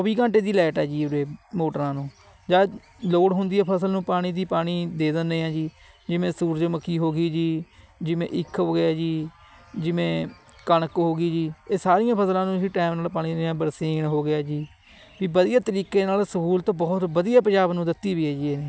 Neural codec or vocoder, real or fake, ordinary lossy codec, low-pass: none; real; none; none